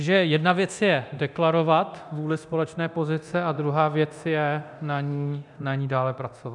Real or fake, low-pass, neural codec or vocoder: fake; 10.8 kHz; codec, 24 kHz, 0.9 kbps, DualCodec